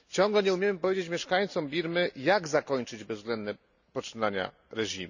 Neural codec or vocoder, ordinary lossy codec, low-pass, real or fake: none; none; 7.2 kHz; real